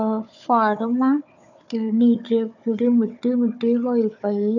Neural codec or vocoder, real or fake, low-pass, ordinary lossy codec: codec, 16 kHz, 4 kbps, FunCodec, trained on Chinese and English, 50 frames a second; fake; 7.2 kHz; none